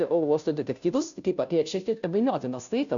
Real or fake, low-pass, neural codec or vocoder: fake; 7.2 kHz; codec, 16 kHz, 0.5 kbps, FunCodec, trained on Chinese and English, 25 frames a second